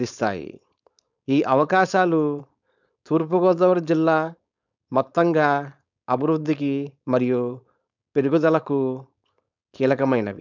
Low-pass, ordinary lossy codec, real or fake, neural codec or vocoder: 7.2 kHz; none; fake; codec, 16 kHz, 4.8 kbps, FACodec